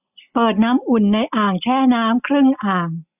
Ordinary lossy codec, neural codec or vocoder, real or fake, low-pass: none; none; real; 3.6 kHz